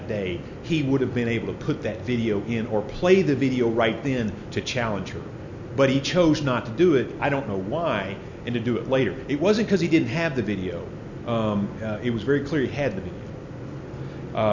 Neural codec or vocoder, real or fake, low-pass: none; real; 7.2 kHz